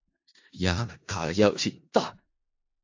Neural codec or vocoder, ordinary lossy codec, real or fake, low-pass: codec, 16 kHz in and 24 kHz out, 0.4 kbps, LongCat-Audio-Codec, four codebook decoder; AAC, 48 kbps; fake; 7.2 kHz